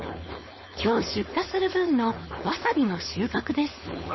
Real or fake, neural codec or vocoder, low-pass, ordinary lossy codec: fake; codec, 16 kHz, 4.8 kbps, FACodec; 7.2 kHz; MP3, 24 kbps